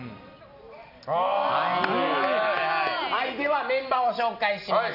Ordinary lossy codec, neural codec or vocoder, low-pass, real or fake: none; none; 5.4 kHz; real